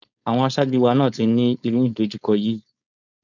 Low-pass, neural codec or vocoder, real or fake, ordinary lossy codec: 7.2 kHz; codec, 16 kHz, 4.8 kbps, FACodec; fake; none